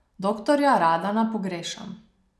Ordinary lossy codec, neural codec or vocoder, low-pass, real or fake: none; none; none; real